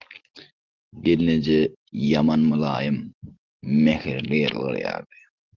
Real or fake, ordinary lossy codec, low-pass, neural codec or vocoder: real; Opus, 16 kbps; 7.2 kHz; none